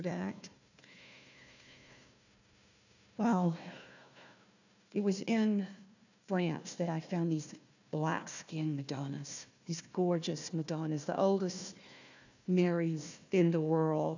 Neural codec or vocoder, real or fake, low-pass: codec, 16 kHz, 1 kbps, FunCodec, trained on Chinese and English, 50 frames a second; fake; 7.2 kHz